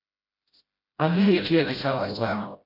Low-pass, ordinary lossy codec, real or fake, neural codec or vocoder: 5.4 kHz; MP3, 32 kbps; fake; codec, 16 kHz, 0.5 kbps, FreqCodec, smaller model